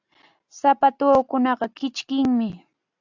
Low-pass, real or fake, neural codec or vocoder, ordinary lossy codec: 7.2 kHz; real; none; AAC, 48 kbps